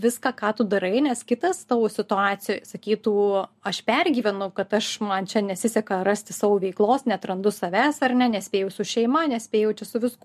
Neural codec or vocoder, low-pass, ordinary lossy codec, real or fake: none; 14.4 kHz; MP3, 64 kbps; real